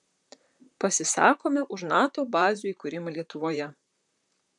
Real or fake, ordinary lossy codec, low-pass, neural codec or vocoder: fake; MP3, 96 kbps; 10.8 kHz; vocoder, 44.1 kHz, 128 mel bands, Pupu-Vocoder